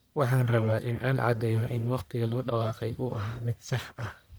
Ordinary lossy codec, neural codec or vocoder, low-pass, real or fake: none; codec, 44.1 kHz, 1.7 kbps, Pupu-Codec; none; fake